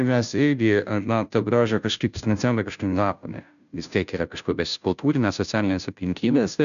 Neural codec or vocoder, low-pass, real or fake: codec, 16 kHz, 0.5 kbps, FunCodec, trained on Chinese and English, 25 frames a second; 7.2 kHz; fake